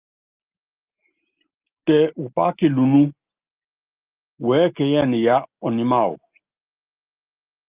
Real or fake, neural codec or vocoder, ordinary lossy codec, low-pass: real; none; Opus, 32 kbps; 3.6 kHz